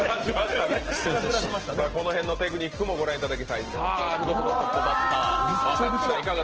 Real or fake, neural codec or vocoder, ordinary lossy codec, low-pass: real; none; Opus, 16 kbps; 7.2 kHz